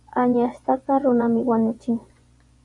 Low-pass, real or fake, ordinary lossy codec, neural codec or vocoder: 10.8 kHz; fake; MP3, 96 kbps; vocoder, 24 kHz, 100 mel bands, Vocos